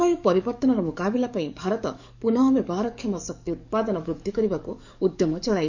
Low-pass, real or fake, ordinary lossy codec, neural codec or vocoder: 7.2 kHz; fake; none; codec, 44.1 kHz, 7.8 kbps, DAC